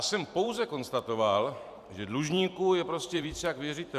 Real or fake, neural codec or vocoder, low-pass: fake; vocoder, 44.1 kHz, 128 mel bands every 512 samples, BigVGAN v2; 14.4 kHz